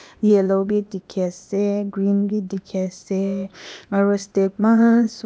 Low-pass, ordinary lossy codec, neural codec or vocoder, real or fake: none; none; codec, 16 kHz, 0.8 kbps, ZipCodec; fake